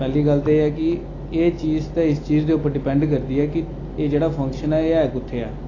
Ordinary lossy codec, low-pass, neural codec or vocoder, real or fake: AAC, 32 kbps; 7.2 kHz; none; real